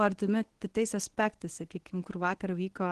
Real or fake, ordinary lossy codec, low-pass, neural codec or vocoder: fake; Opus, 16 kbps; 10.8 kHz; codec, 24 kHz, 0.9 kbps, WavTokenizer, medium speech release version 1